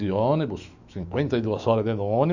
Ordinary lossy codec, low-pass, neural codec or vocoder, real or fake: Opus, 64 kbps; 7.2 kHz; autoencoder, 48 kHz, 128 numbers a frame, DAC-VAE, trained on Japanese speech; fake